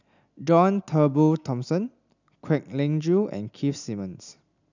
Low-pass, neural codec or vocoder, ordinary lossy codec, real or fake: 7.2 kHz; none; none; real